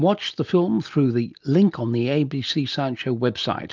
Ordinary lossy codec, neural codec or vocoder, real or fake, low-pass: Opus, 24 kbps; none; real; 7.2 kHz